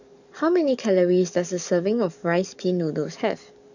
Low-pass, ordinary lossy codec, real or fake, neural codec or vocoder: 7.2 kHz; none; fake; codec, 44.1 kHz, 7.8 kbps, DAC